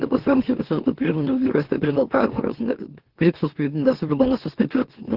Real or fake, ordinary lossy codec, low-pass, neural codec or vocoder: fake; Opus, 16 kbps; 5.4 kHz; autoencoder, 44.1 kHz, a latent of 192 numbers a frame, MeloTTS